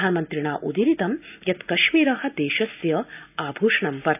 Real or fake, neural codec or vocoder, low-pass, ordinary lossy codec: real; none; 3.6 kHz; none